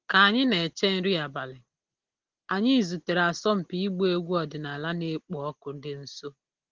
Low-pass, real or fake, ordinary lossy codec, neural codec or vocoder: 7.2 kHz; real; Opus, 16 kbps; none